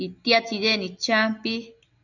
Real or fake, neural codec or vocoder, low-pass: real; none; 7.2 kHz